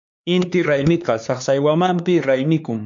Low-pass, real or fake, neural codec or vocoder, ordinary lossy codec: 7.2 kHz; fake; codec, 16 kHz, 4 kbps, X-Codec, HuBERT features, trained on LibriSpeech; MP3, 64 kbps